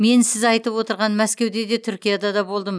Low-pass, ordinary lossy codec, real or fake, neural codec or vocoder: none; none; real; none